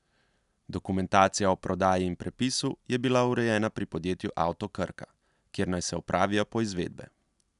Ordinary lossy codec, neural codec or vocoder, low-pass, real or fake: none; none; 10.8 kHz; real